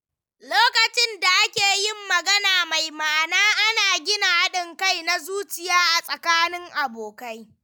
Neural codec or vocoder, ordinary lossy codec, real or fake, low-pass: none; none; real; none